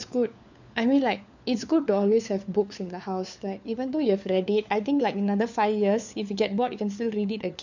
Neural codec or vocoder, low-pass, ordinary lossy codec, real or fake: codec, 16 kHz, 4 kbps, FunCodec, trained on LibriTTS, 50 frames a second; 7.2 kHz; none; fake